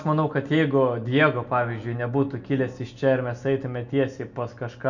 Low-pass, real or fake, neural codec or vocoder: 7.2 kHz; real; none